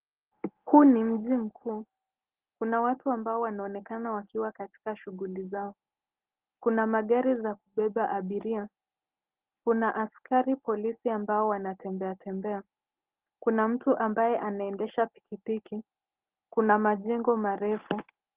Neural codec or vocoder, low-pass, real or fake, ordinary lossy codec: none; 3.6 kHz; real; Opus, 16 kbps